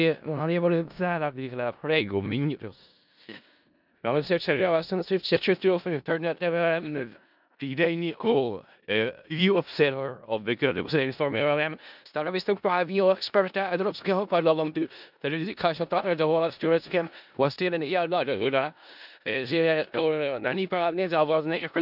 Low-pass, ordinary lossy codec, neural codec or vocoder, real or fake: 5.4 kHz; none; codec, 16 kHz in and 24 kHz out, 0.4 kbps, LongCat-Audio-Codec, four codebook decoder; fake